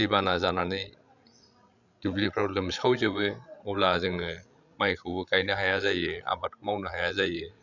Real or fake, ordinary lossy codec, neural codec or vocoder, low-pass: fake; none; codec, 16 kHz, 16 kbps, FreqCodec, larger model; 7.2 kHz